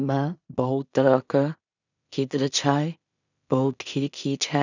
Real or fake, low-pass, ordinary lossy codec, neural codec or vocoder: fake; 7.2 kHz; none; codec, 16 kHz in and 24 kHz out, 0.4 kbps, LongCat-Audio-Codec, two codebook decoder